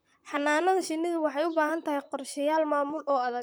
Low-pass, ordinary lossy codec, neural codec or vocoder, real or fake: none; none; vocoder, 44.1 kHz, 128 mel bands, Pupu-Vocoder; fake